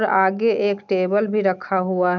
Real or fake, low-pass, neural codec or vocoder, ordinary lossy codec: real; 7.2 kHz; none; none